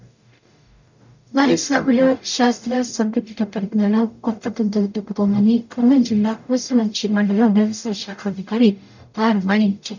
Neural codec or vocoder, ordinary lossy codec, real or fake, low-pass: codec, 44.1 kHz, 0.9 kbps, DAC; none; fake; 7.2 kHz